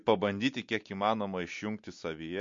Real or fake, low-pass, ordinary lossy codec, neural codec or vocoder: real; 7.2 kHz; MP3, 48 kbps; none